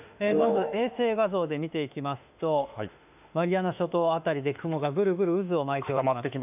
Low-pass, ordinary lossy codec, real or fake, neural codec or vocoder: 3.6 kHz; none; fake; autoencoder, 48 kHz, 32 numbers a frame, DAC-VAE, trained on Japanese speech